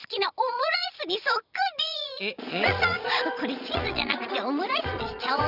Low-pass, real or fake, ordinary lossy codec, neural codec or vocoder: 5.4 kHz; fake; none; vocoder, 22.05 kHz, 80 mel bands, Vocos